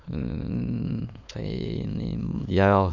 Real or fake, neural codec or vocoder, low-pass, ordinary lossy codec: fake; autoencoder, 22.05 kHz, a latent of 192 numbers a frame, VITS, trained on many speakers; 7.2 kHz; none